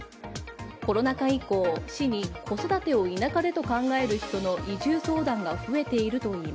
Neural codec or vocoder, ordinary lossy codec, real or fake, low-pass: none; none; real; none